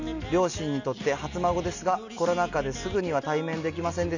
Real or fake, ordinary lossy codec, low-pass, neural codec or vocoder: real; none; 7.2 kHz; none